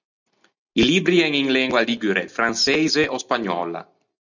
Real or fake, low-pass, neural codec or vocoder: real; 7.2 kHz; none